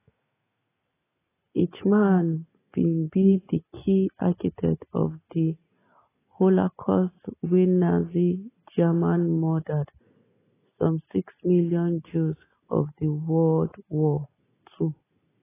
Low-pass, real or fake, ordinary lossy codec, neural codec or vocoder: 3.6 kHz; real; AAC, 16 kbps; none